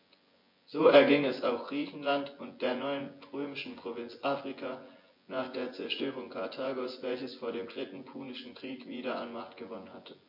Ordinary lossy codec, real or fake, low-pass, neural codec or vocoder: MP3, 32 kbps; fake; 5.4 kHz; vocoder, 24 kHz, 100 mel bands, Vocos